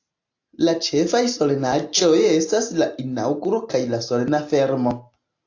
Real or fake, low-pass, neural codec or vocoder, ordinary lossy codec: real; 7.2 kHz; none; AAC, 48 kbps